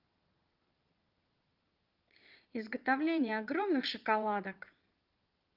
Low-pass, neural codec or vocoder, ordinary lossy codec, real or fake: 5.4 kHz; vocoder, 22.05 kHz, 80 mel bands, Vocos; Opus, 32 kbps; fake